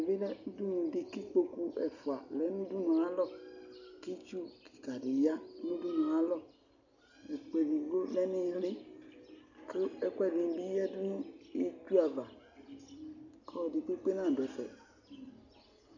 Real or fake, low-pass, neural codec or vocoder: real; 7.2 kHz; none